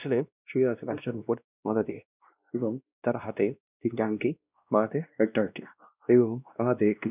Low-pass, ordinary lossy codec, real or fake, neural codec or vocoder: 3.6 kHz; none; fake; codec, 16 kHz, 1 kbps, X-Codec, WavLM features, trained on Multilingual LibriSpeech